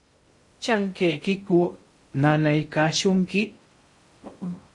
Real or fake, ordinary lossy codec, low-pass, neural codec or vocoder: fake; MP3, 48 kbps; 10.8 kHz; codec, 16 kHz in and 24 kHz out, 0.6 kbps, FocalCodec, streaming, 2048 codes